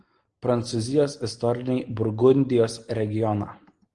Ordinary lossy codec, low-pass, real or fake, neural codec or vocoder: Opus, 24 kbps; 9.9 kHz; real; none